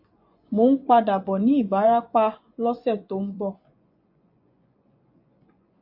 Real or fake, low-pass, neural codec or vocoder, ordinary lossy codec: fake; 5.4 kHz; vocoder, 24 kHz, 100 mel bands, Vocos; AAC, 48 kbps